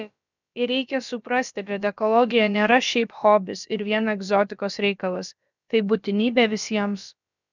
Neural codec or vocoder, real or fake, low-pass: codec, 16 kHz, about 1 kbps, DyCAST, with the encoder's durations; fake; 7.2 kHz